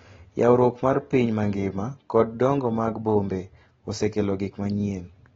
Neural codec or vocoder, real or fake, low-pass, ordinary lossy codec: none; real; 7.2 kHz; AAC, 24 kbps